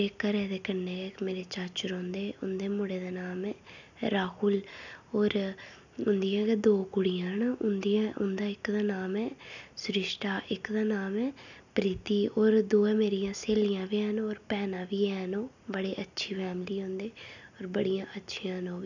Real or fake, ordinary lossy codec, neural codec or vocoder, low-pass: real; none; none; 7.2 kHz